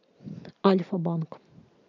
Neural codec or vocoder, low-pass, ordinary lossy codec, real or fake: none; 7.2 kHz; none; real